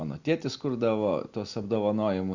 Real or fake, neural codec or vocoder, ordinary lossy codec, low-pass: real; none; Opus, 64 kbps; 7.2 kHz